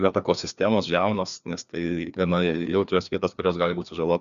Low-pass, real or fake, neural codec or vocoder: 7.2 kHz; fake; codec, 16 kHz, 2 kbps, FreqCodec, larger model